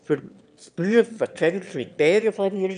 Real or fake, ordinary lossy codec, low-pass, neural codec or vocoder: fake; none; 9.9 kHz; autoencoder, 22.05 kHz, a latent of 192 numbers a frame, VITS, trained on one speaker